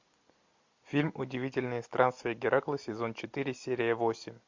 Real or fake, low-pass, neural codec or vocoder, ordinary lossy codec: real; 7.2 kHz; none; MP3, 48 kbps